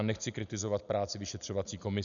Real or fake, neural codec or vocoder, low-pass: real; none; 7.2 kHz